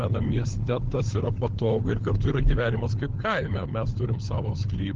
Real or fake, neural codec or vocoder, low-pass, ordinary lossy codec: fake; codec, 16 kHz, 4 kbps, FunCodec, trained on LibriTTS, 50 frames a second; 7.2 kHz; Opus, 16 kbps